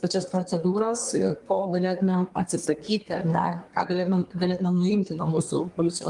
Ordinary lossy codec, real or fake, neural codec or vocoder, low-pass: Opus, 32 kbps; fake; codec, 24 kHz, 1 kbps, SNAC; 10.8 kHz